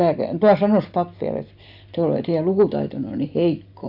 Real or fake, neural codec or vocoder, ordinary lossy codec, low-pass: real; none; none; 5.4 kHz